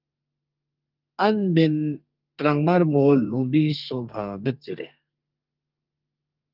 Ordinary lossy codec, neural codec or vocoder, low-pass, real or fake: Opus, 32 kbps; codec, 32 kHz, 1.9 kbps, SNAC; 5.4 kHz; fake